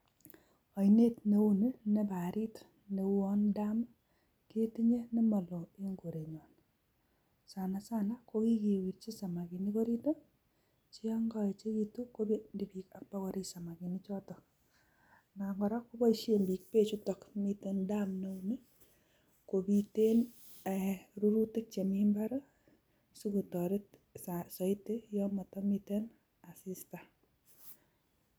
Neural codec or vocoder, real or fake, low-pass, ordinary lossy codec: none; real; none; none